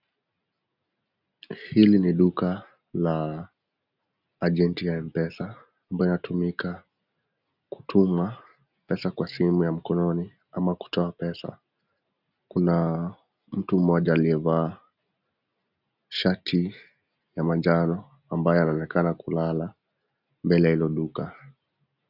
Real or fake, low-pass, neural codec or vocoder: real; 5.4 kHz; none